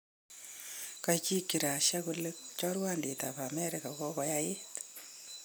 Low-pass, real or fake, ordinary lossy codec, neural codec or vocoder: none; real; none; none